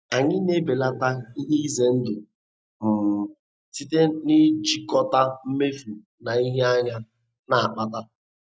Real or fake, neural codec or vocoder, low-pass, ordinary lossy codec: real; none; none; none